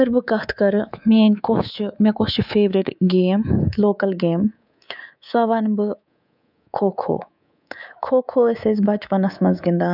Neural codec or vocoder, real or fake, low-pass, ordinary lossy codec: codec, 24 kHz, 3.1 kbps, DualCodec; fake; 5.4 kHz; none